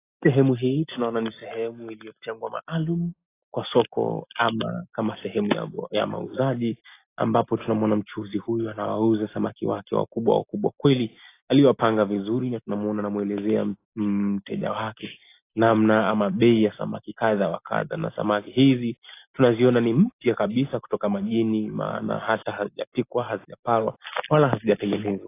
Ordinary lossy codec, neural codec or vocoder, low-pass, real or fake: AAC, 24 kbps; none; 3.6 kHz; real